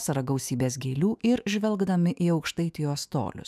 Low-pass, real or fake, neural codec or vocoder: 14.4 kHz; fake; autoencoder, 48 kHz, 128 numbers a frame, DAC-VAE, trained on Japanese speech